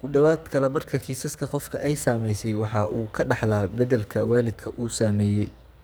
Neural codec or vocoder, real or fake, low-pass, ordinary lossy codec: codec, 44.1 kHz, 2.6 kbps, SNAC; fake; none; none